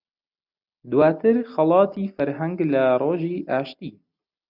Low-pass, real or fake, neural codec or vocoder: 5.4 kHz; real; none